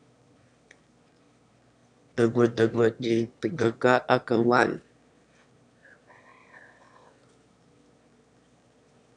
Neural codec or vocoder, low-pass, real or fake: autoencoder, 22.05 kHz, a latent of 192 numbers a frame, VITS, trained on one speaker; 9.9 kHz; fake